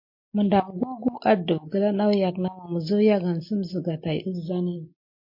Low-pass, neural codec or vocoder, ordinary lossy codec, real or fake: 5.4 kHz; none; MP3, 32 kbps; real